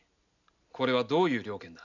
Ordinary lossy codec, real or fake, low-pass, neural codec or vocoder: none; real; 7.2 kHz; none